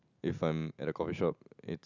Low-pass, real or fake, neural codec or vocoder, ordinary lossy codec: 7.2 kHz; real; none; none